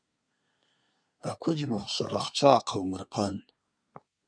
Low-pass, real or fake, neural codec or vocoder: 9.9 kHz; fake; codec, 24 kHz, 1 kbps, SNAC